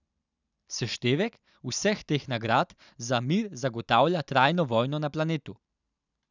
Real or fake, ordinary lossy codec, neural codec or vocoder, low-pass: real; none; none; 7.2 kHz